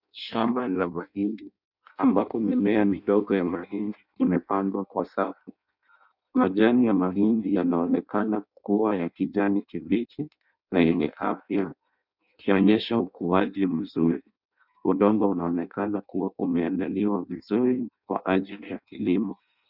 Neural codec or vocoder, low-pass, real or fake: codec, 16 kHz in and 24 kHz out, 0.6 kbps, FireRedTTS-2 codec; 5.4 kHz; fake